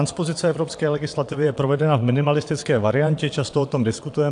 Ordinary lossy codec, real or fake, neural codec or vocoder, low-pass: AAC, 64 kbps; fake; vocoder, 22.05 kHz, 80 mel bands, Vocos; 9.9 kHz